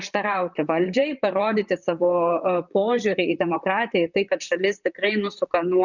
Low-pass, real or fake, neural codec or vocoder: 7.2 kHz; fake; vocoder, 44.1 kHz, 128 mel bands, Pupu-Vocoder